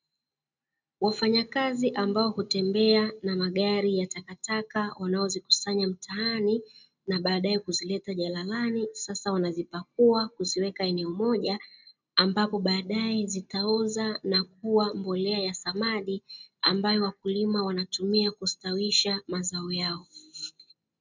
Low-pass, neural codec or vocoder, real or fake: 7.2 kHz; none; real